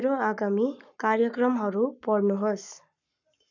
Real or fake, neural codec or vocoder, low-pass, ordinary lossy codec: fake; codec, 44.1 kHz, 7.8 kbps, Pupu-Codec; 7.2 kHz; none